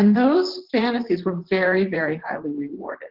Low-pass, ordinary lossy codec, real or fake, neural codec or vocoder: 5.4 kHz; Opus, 16 kbps; fake; vocoder, 44.1 kHz, 128 mel bands, Pupu-Vocoder